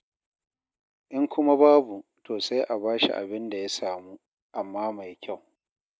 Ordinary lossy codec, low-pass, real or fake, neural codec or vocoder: none; none; real; none